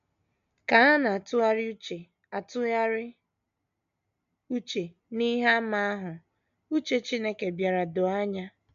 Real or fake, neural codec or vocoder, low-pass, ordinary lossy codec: real; none; 7.2 kHz; none